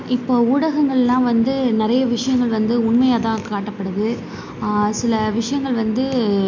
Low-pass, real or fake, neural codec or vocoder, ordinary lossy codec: 7.2 kHz; real; none; MP3, 48 kbps